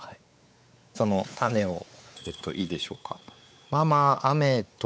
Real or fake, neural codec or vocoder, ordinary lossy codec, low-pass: fake; codec, 16 kHz, 4 kbps, X-Codec, WavLM features, trained on Multilingual LibriSpeech; none; none